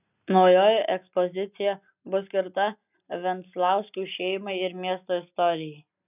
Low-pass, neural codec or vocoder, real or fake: 3.6 kHz; none; real